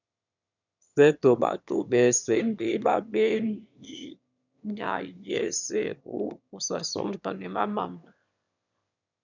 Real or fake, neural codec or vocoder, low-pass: fake; autoencoder, 22.05 kHz, a latent of 192 numbers a frame, VITS, trained on one speaker; 7.2 kHz